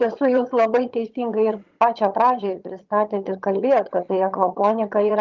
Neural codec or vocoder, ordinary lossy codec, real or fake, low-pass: vocoder, 22.05 kHz, 80 mel bands, HiFi-GAN; Opus, 32 kbps; fake; 7.2 kHz